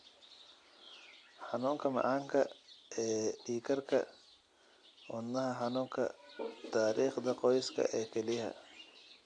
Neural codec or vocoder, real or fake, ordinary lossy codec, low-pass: none; real; AAC, 48 kbps; 9.9 kHz